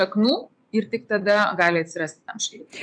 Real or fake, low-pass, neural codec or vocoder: fake; 9.9 kHz; vocoder, 24 kHz, 100 mel bands, Vocos